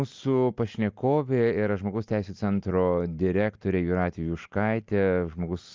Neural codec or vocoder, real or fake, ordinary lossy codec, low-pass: none; real; Opus, 24 kbps; 7.2 kHz